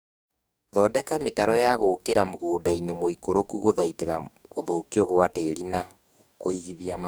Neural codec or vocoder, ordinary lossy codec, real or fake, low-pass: codec, 44.1 kHz, 2.6 kbps, DAC; none; fake; none